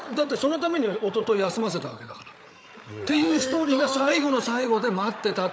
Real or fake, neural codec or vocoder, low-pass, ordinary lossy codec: fake; codec, 16 kHz, 8 kbps, FreqCodec, larger model; none; none